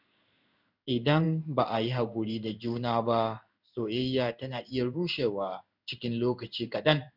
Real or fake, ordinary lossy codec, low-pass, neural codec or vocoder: fake; none; 5.4 kHz; codec, 16 kHz in and 24 kHz out, 1 kbps, XY-Tokenizer